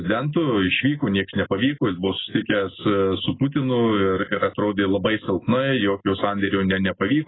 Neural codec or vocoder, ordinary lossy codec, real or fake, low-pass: none; AAC, 16 kbps; real; 7.2 kHz